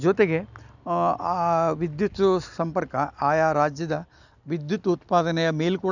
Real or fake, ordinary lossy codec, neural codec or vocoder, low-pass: real; none; none; 7.2 kHz